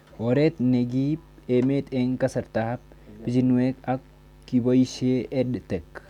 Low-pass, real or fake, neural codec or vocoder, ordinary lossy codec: 19.8 kHz; real; none; none